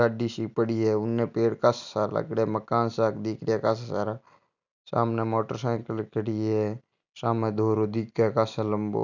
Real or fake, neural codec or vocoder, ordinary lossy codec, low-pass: real; none; none; 7.2 kHz